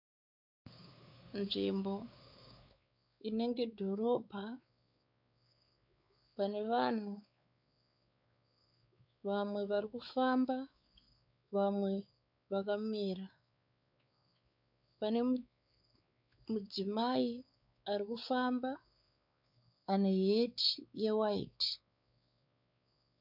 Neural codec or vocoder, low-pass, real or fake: codec, 16 kHz, 4 kbps, X-Codec, WavLM features, trained on Multilingual LibriSpeech; 5.4 kHz; fake